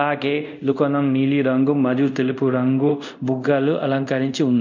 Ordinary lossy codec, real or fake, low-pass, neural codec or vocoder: none; fake; 7.2 kHz; codec, 24 kHz, 0.5 kbps, DualCodec